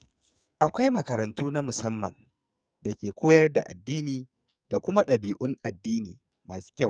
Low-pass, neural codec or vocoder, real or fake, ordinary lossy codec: 9.9 kHz; codec, 44.1 kHz, 2.6 kbps, SNAC; fake; none